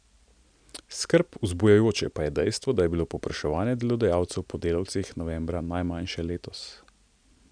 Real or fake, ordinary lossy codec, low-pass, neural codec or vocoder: real; none; 9.9 kHz; none